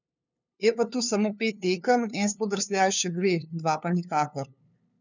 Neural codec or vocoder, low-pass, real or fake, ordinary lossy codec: codec, 16 kHz, 2 kbps, FunCodec, trained on LibriTTS, 25 frames a second; 7.2 kHz; fake; none